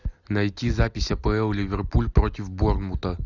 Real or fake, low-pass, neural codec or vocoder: real; 7.2 kHz; none